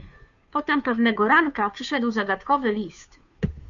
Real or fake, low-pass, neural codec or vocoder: fake; 7.2 kHz; codec, 16 kHz, 2 kbps, FunCodec, trained on Chinese and English, 25 frames a second